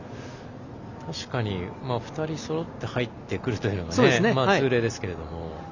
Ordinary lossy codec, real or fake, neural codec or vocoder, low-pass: none; real; none; 7.2 kHz